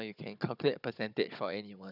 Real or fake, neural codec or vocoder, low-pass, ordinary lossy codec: fake; codec, 16 kHz, 16 kbps, FunCodec, trained on Chinese and English, 50 frames a second; 5.4 kHz; none